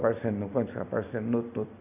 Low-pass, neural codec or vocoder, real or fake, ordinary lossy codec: 3.6 kHz; none; real; none